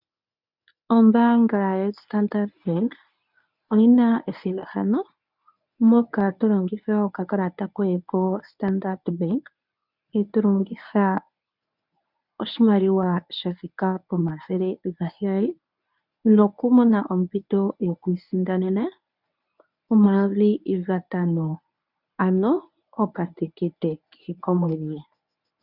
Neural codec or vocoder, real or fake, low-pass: codec, 24 kHz, 0.9 kbps, WavTokenizer, medium speech release version 1; fake; 5.4 kHz